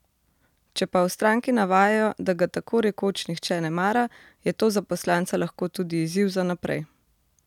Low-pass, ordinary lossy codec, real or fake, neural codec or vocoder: 19.8 kHz; none; real; none